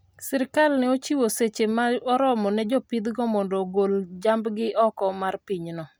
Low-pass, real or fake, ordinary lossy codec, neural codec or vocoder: none; real; none; none